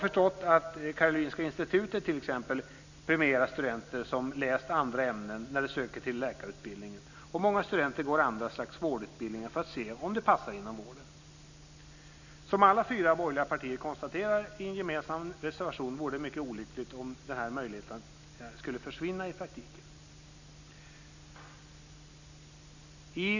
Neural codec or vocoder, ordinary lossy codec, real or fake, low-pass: none; none; real; 7.2 kHz